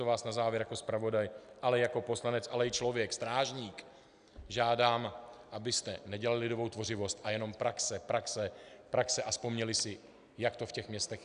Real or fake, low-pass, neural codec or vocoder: real; 9.9 kHz; none